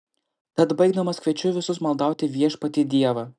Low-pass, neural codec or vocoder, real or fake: 9.9 kHz; none; real